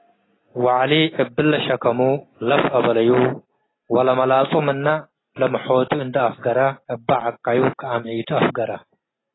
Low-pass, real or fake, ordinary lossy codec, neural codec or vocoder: 7.2 kHz; real; AAC, 16 kbps; none